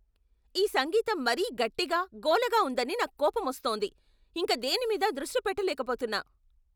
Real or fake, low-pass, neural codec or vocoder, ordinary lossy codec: real; none; none; none